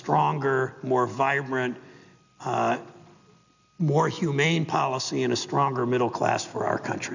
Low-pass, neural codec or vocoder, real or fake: 7.2 kHz; none; real